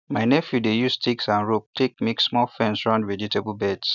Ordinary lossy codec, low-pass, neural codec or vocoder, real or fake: none; 7.2 kHz; none; real